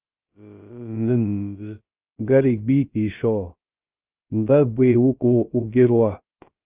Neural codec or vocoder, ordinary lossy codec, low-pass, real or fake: codec, 16 kHz, 0.3 kbps, FocalCodec; Opus, 24 kbps; 3.6 kHz; fake